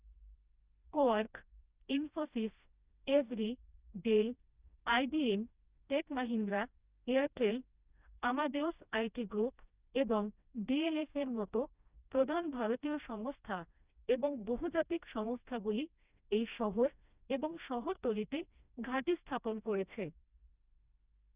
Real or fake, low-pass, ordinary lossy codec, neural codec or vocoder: fake; 3.6 kHz; Opus, 64 kbps; codec, 16 kHz, 1 kbps, FreqCodec, smaller model